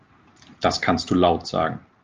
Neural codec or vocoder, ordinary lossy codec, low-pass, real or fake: none; Opus, 24 kbps; 7.2 kHz; real